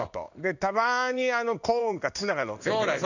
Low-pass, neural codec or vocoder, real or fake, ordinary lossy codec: 7.2 kHz; codec, 16 kHz in and 24 kHz out, 1 kbps, XY-Tokenizer; fake; none